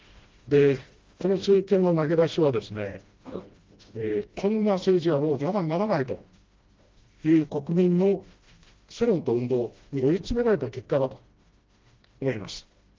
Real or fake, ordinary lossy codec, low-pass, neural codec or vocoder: fake; Opus, 32 kbps; 7.2 kHz; codec, 16 kHz, 1 kbps, FreqCodec, smaller model